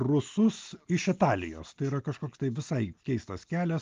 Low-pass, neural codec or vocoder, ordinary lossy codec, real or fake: 7.2 kHz; none; Opus, 32 kbps; real